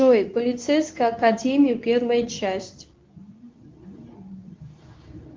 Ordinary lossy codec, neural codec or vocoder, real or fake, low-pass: Opus, 24 kbps; codec, 24 kHz, 0.9 kbps, WavTokenizer, medium speech release version 2; fake; 7.2 kHz